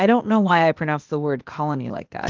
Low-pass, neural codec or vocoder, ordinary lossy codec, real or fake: 7.2 kHz; codec, 16 kHz, 0.8 kbps, ZipCodec; Opus, 24 kbps; fake